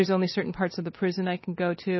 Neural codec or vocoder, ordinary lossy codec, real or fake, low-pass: none; MP3, 24 kbps; real; 7.2 kHz